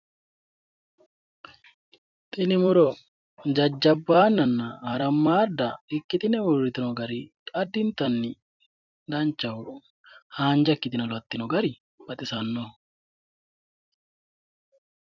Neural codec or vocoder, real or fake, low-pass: vocoder, 44.1 kHz, 128 mel bands every 256 samples, BigVGAN v2; fake; 7.2 kHz